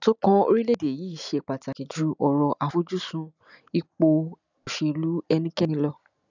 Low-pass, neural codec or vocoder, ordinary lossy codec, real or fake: 7.2 kHz; none; none; real